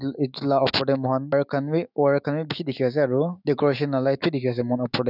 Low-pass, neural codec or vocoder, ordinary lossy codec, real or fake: 5.4 kHz; autoencoder, 48 kHz, 128 numbers a frame, DAC-VAE, trained on Japanese speech; none; fake